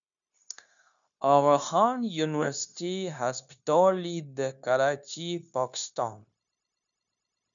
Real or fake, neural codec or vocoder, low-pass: fake; codec, 16 kHz, 0.9 kbps, LongCat-Audio-Codec; 7.2 kHz